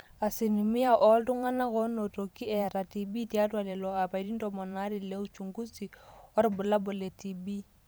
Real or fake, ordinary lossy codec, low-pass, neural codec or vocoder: fake; none; none; vocoder, 44.1 kHz, 128 mel bands every 512 samples, BigVGAN v2